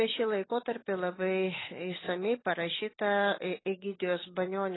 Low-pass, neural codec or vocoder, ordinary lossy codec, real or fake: 7.2 kHz; none; AAC, 16 kbps; real